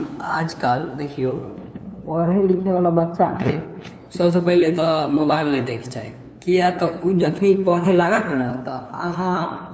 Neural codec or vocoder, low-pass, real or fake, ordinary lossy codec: codec, 16 kHz, 2 kbps, FunCodec, trained on LibriTTS, 25 frames a second; none; fake; none